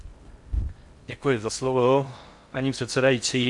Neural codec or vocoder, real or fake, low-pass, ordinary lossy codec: codec, 16 kHz in and 24 kHz out, 0.6 kbps, FocalCodec, streaming, 4096 codes; fake; 10.8 kHz; MP3, 64 kbps